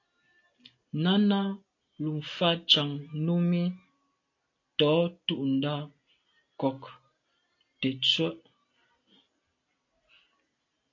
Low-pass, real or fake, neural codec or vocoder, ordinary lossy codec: 7.2 kHz; real; none; AAC, 48 kbps